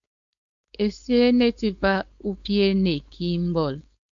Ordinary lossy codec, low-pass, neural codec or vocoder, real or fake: MP3, 64 kbps; 7.2 kHz; codec, 16 kHz, 4.8 kbps, FACodec; fake